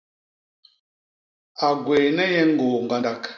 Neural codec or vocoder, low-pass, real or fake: none; 7.2 kHz; real